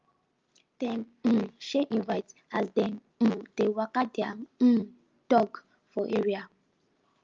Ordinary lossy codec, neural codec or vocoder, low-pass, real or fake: Opus, 32 kbps; none; 7.2 kHz; real